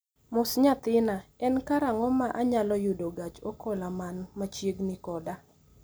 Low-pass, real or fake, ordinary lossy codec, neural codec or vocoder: none; real; none; none